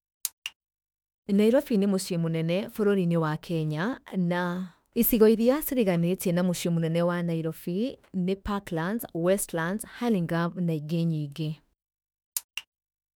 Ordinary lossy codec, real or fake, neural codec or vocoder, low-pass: none; fake; autoencoder, 48 kHz, 32 numbers a frame, DAC-VAE, trained on Japanese speech; none